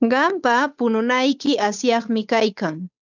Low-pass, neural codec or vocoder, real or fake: 7.2 kHz; codec, 16 kHz, 8 kbps, FunCodec, trained on Chinese and English, 25 frames a second; fake